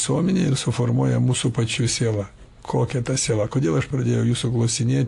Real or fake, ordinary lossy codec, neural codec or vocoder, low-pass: real; AAC, 64 kbps; none; 10.8 kHz